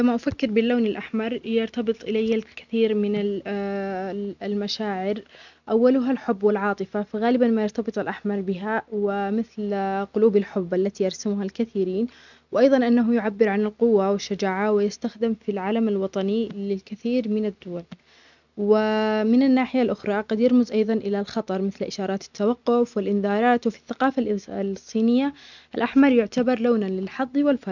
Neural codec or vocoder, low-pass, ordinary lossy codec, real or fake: none; 7.2 kHz; none; real